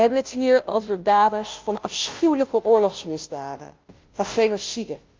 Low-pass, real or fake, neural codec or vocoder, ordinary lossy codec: 7.2 kHz; fake; codec, 16 kHz, 0.5 kbps, FunCodec, trained on Chinese and English, 25 frames a second; Opus, 24 kbps